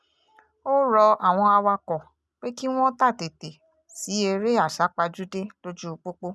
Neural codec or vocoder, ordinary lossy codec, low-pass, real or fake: none; none; none; real